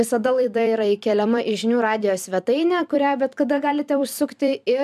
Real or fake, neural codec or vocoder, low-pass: fake; vocoder, 44.1 kHz, 128 mel bands every 256 samples, BigVGAN v2; 14.4 kHz